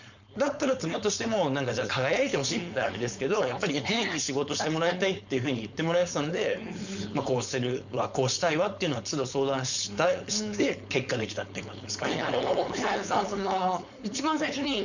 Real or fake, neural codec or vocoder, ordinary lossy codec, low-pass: fake; codec, 16 kHz, 4.8 kbps, FACodec; none; 7.2 kHz